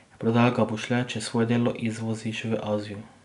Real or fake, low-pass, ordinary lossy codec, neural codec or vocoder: real; 10.8 kHz; none; none